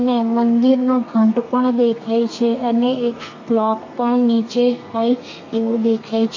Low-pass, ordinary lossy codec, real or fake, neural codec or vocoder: 7.2 kHz; AAC, 48 kbps; fake; codec, 32 kHz, 1.9 kbps, SNAC